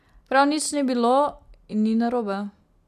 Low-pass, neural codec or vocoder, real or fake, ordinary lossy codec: 14.4 kHz; none; real; MP3, 96 kbps